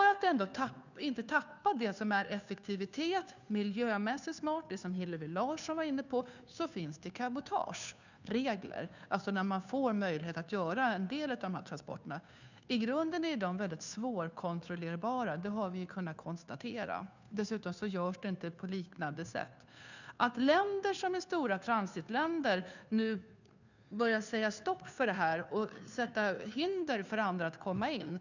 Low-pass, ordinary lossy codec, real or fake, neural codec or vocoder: 7.2 kHz; none; fake; codec, 16 kHz, 2 kbps, FunCodec, trained on Chinese and English, 25 frames a second